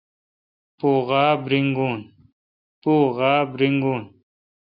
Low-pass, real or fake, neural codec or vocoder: 5.4 kHz; real; none